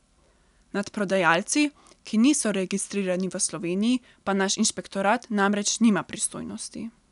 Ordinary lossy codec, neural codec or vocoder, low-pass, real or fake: none; none; 10.8 kHz; real